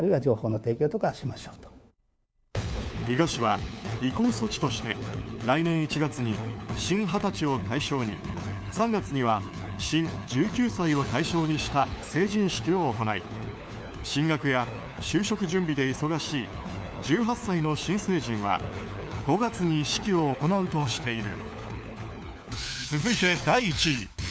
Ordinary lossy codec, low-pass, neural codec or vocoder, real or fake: none; none; codec, 16 kHz, 4 kbps, FunCodec, trained on LibriTTS, 50 frames a second; fake